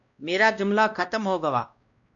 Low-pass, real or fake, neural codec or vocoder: 7.2 kHz; fake; codec, 16 kHz, 1 kbps, X-Codec, WavLM features, trained on Multilingual LibriSpeech